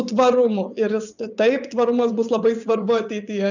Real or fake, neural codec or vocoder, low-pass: fake; vocoder, 22.05 kHz, 80 mel bands, WaveNeXt; 7.2 kHz